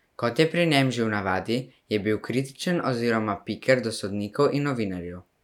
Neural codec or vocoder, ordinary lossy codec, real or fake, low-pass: none; none; real; 19.8 kHz